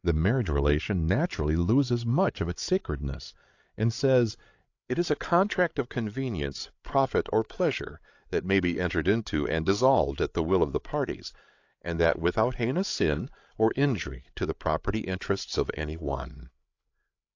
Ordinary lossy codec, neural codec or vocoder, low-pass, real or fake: AAC, 48 kbps; codec, 16 kHz, 8 kbps, FreqCodec, larger model; 7.2 kHz; fake